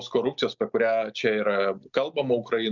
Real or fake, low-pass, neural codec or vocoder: real; 7.2 kHz; none